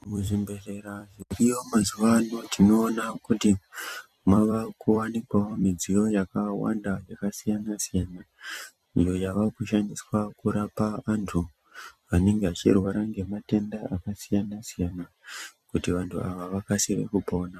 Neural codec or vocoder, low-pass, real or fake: vocoder, 44.1 kHz, 128 mel bands every 256 samples, BigVGAN v2; 14.4 kHz; fake